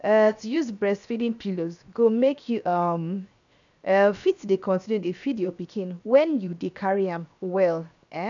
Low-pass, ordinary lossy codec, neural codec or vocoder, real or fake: 7.2 kHz; none; codec, 16 kHz, 0.7 kbps, FocalCodec; fake